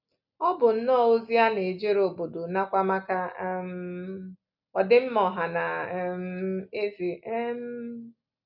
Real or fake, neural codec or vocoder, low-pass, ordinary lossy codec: real; none; 5.4 kHz; none